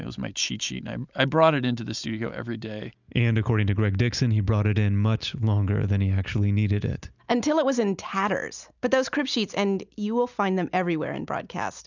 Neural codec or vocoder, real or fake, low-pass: none; real; 7.2 kHz